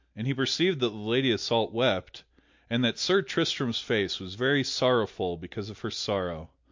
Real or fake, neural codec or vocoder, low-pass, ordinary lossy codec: real; none; 7.2 kHz; MP3, 48 kbps